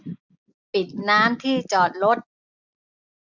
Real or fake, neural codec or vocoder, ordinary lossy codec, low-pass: real; none; none; 7.2 kHz